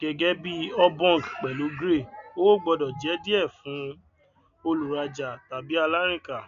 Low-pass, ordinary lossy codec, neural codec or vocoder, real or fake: 7.2 kHz; none; none; real